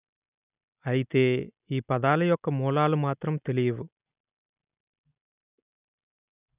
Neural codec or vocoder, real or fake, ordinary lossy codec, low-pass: none; real; none; 3.6 kHz